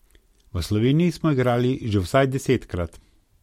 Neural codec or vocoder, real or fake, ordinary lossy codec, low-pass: none; real; MP3, 64 kbps; 19.8 kHz